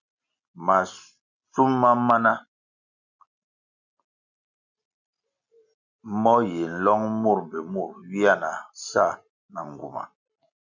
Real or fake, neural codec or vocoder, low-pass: real; none; 7.2 kHz